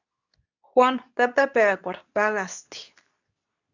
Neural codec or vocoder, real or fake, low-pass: codec, 24 kHz, 0.9 kbps, WavTokenizer, medium speech release version 2; fake; 7.2 kHz